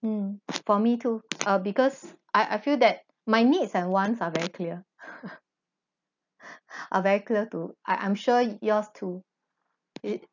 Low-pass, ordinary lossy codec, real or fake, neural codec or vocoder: 7.2 kHz; none; real; none